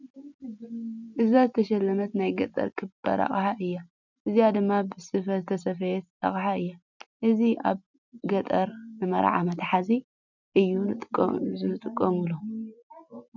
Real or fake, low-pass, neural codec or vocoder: real; 7.2 kHz; none